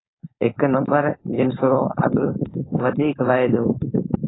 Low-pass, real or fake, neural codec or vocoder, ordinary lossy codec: 7.2 kHz; fake; codec, 16 kHz, 4.8 kbps, FACodec; AAC, 16 kbps